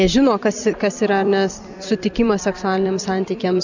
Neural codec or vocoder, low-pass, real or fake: vocoder, 44.1 kHz, 128 mel bands every 256 samples, BigVGAN v2; 7.2 kHz; fake